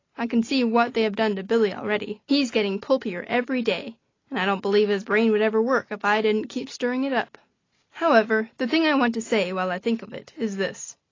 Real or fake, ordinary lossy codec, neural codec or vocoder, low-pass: real; AAC, 32 kbps; none; 7.2 kHz